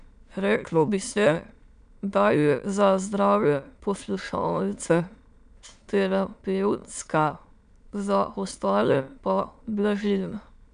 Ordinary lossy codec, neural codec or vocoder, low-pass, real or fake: none; autoencoder, 22.05 kHz, a latent of 192 numbers a frame, VITS, trained on many speakers; 9.9 kHz; fake